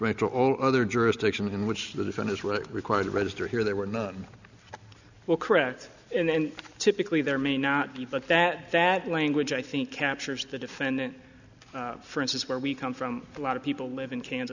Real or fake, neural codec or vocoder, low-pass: real; none; 7.2 kHz